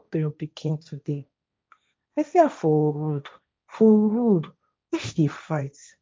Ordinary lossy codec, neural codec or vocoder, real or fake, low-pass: none; codec, 16 kHz, 1.1 kbps, Voila-Tokenizer; fake; none